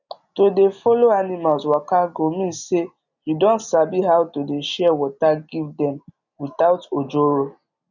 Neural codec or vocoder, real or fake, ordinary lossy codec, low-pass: none; real; none; 7.2 kHz